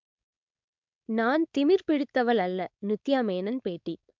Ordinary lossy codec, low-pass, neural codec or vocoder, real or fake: MP3, 64 kbps; 7.2 kHz; codec, 16 kHz, 4.8 kbps, FACodec; fake